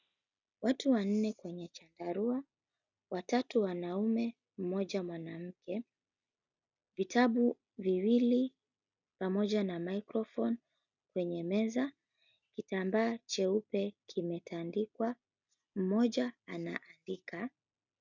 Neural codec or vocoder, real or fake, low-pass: none; real; 7.2 kHz